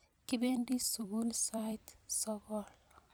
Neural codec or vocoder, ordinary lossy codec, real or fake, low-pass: vocoder, 44.1 kHz, 128 mel bands every 256 samples, BigVGAN v2; none; fake; none